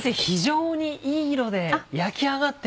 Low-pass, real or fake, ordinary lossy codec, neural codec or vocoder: none; real; none; none